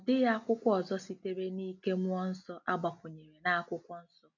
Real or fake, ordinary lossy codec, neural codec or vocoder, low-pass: real; none; none; 7.2 kHz